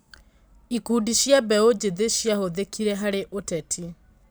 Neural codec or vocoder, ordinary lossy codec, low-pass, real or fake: vocoder, 44.1 kHz, 128 mel bands every 512 samples, BigVGAN v2; none; none; fake